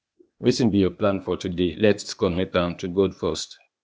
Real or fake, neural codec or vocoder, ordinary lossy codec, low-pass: fake; codec, 16 kHz, 0.8 kbps, ZipCodec; none; none